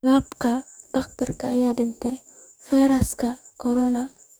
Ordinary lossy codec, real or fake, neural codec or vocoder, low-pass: none; fake; codec, 44.1 kHz, 2.6 kbps, DAC; none